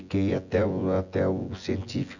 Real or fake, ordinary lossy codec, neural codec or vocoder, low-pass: fake; none; vocoder, 24 kHz, 100 mel bands, Vocos; 7.2 kHz